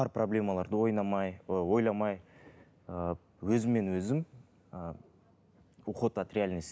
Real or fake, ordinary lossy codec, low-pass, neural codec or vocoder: real; none; none; none